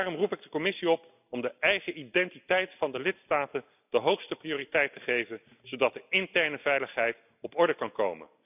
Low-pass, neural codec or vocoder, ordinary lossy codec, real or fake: 3.6 kHz; none; none; real